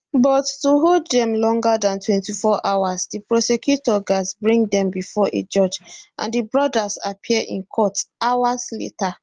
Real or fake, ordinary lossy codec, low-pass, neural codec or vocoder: real; Opus, 24 kbps; 9.9 kHz; none